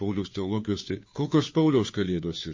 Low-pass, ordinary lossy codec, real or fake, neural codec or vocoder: 7.2 kHz; MP3, 32 kbps; fake; codec, 16 kHz, 2 kbps, FunCodec, trained on Chinese and English, 25 frames a second